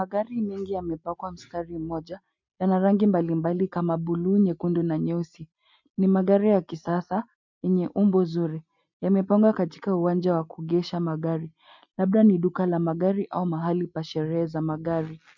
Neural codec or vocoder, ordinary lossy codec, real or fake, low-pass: none; MP3, 64 kbps; real; 7.2 kHz